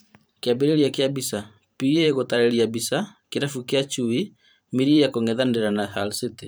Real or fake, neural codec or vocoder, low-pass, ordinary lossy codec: fake; vocoder, 44.1 kHz, 128 mel bands every 512 samples, BigVGAN v2; none; none